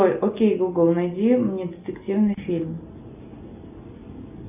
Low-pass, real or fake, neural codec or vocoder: 3.6 kHz; real; none